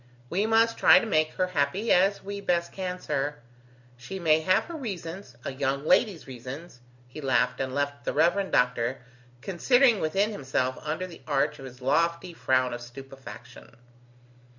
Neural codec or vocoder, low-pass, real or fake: none; 7.2 kHz; real